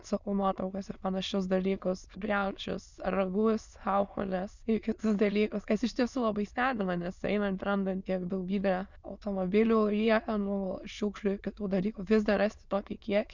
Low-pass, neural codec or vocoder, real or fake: 7.2 kHz; autoencoder, 22.05 kHz, a latent of 192 numbers a frame, VITS, trained on many speakers; fake